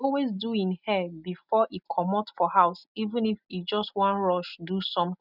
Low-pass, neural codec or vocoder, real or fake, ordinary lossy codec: 5.4 kHz; none; real; none